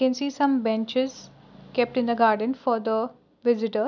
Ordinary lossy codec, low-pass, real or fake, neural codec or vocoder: none; 7.2 kHz; real; none